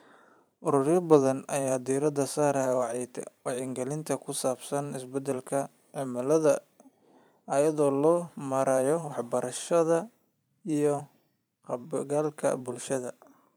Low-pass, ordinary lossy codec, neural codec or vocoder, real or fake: none; none; vocoder, 44.1 kHz, 128 mel bands every 512 samples, BigVGAN v2; fake